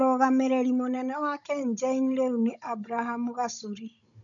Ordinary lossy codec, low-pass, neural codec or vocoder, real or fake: none; 7.2 kHz; codec, 16 kHz, 16 kbps, FunCodec, trained on Chinese and English, 50 frames a second; fake